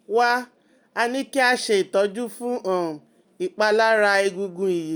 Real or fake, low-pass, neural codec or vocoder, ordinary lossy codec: real; none; none; none